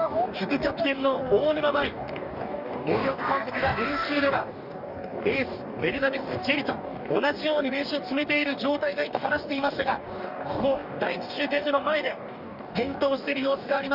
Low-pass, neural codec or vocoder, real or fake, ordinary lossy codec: 5.4 kHz; codec, 44.1 kHz, 2.6 kbps, DAC; fake; none